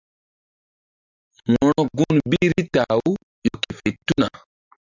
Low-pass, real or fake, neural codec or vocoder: 7.2 kHz; real; none